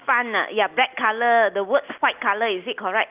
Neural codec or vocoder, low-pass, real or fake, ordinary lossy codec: none; 3.6 kHz; real; Opus, 24 kbps